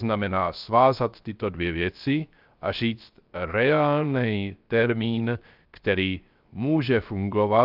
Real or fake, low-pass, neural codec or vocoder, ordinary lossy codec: fake; 5.4 kHz; codec, 16 kHz, 0.3 kbps, FocalCodec; Opus, 24 kbps